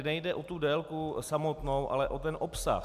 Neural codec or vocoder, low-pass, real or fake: none; 14.4 kHz; real